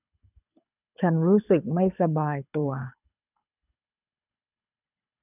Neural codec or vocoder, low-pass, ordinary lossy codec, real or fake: codec, 24 kHz, 6 kbps, HILCodec; 3.6 kHz; none; fake